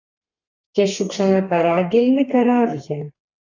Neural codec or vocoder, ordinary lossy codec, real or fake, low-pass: codec, 32 kHz, 1.9 kbps, SNAC; AAC, 32 kbps; fake; 7.2 kHz